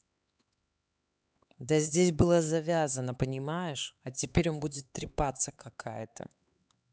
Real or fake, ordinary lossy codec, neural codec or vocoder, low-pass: fake; none; codec, 16 kHz, 4 kbps, X-Codec, HuBERT features, trained on LibriSpeech; none